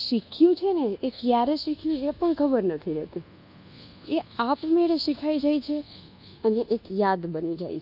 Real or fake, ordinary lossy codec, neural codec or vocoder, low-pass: fake; none; codec, 24 kHz, 1.2 kbps, DualCodec; 5.4 kHz